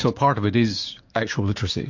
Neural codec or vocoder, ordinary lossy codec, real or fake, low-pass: codec, 16 kHz, 6 kbps, DAC; MP3, 48 kbps; fake; 7.2 kHz